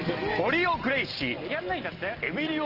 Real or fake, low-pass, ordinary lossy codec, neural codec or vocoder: real; 5.4 kHz; Opus, 16 kbps; none